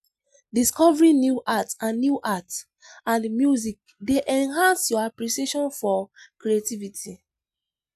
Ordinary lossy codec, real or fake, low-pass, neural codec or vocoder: none; real; 14.4 kHz; none